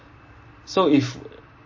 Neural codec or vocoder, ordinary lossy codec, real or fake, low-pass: none; MP3, 32 kbps; real; 7.2 kHz